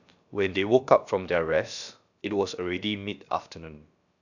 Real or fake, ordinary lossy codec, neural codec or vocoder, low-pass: fake; none; codec, 16 kHz, about 1 kbps, DyCAST, with the encoder's durations; 7.2 kHz